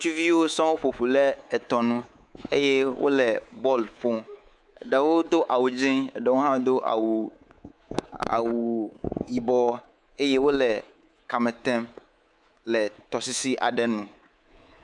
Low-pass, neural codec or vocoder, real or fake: 10.8 kHz; codec, 24 kHz, 3.1 kbps, DualCodec; fake